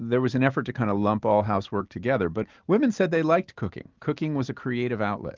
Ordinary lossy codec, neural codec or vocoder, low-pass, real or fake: Opus, 32 kbps; none; 7.2 kHz; real